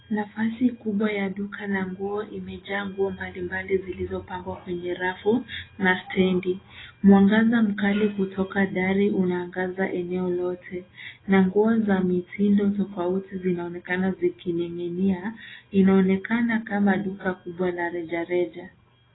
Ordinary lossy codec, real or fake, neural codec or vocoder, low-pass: AAC, 16 kbps; real; none; 7.2 kHz